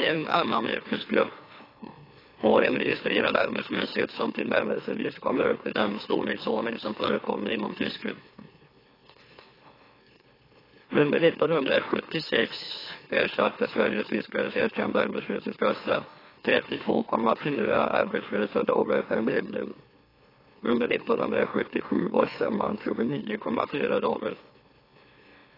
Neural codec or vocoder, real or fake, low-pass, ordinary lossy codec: autoencoder, 44.1 kHz, a latent of 192 numbers a frame, MeloTTS; fake; 5.4 kHz; AAC, 24 kbps